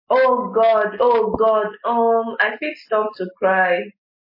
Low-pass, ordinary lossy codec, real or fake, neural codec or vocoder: 5.4 kHz; MP3, 24 kbps; real; none